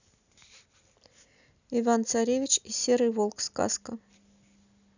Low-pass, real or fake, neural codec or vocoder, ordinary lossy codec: 7.2 kHz; real; none; none